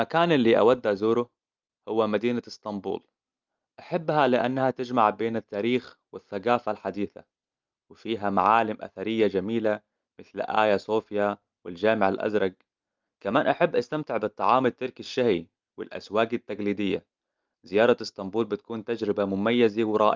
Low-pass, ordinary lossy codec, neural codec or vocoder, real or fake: 7.2 kHz; Opus, 24 kbps; none; real